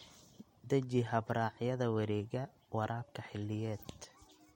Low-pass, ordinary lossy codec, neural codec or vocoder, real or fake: 19.8 kHz; MP3, 64 kbps; none; real